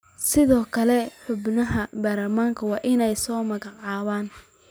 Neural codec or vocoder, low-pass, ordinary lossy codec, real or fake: none; none; none; real